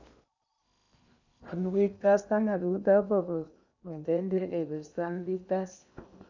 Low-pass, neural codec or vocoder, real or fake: 7.2 kHz; codec, 16 kHz in and 24 kHz out, 0.8 kbps, FocalCodec, streaming, 65536 codes; fake